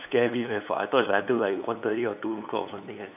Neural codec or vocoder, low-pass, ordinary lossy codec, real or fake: codec, 16 kHz, 8 kbps, FunCodec, trained on LibriTTS, 25 frames a second; 3.6 kHz; none; fake